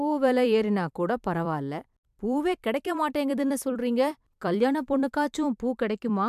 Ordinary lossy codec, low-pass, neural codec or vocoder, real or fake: MP3, 96 kbps; 14.4 kHz; vocoder, 44.1 kHz, 128 mel bands every 256 samples, BigVGAN v2; fake